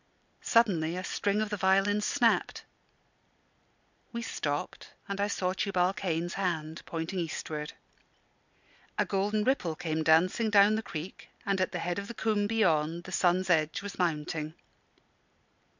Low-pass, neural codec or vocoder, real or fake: 7.2 kHz; none; real